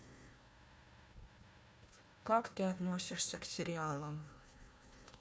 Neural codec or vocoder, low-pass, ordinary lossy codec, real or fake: codec, 16 kHz, 1 kbps, FunCodec, trained on Chinese and English, 50 frames a second; none; none; fake